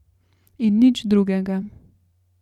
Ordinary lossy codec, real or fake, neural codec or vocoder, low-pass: none; fake; vocoder, 44.1 kHz, 128 mel bands every 512 samples, BigVGAN v2; 19.8 kHz